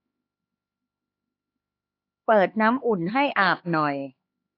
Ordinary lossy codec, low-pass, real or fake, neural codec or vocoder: AAC, 32 kbps; 5.4 kHz; fake; codec, 16 kHz, 4 kbps, X-Codec, HuBERT features, trained on LibriSpeech